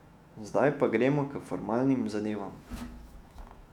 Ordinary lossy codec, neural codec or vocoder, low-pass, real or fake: none; autoencoder, 48 kHz, 128 numbers a frame, DAC-VAE, trained on Japanese speech; 19.8 kHz; fake